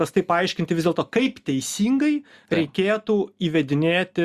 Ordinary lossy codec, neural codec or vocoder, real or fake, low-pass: Opus, 64 kbps; none; real; 14.4 kHz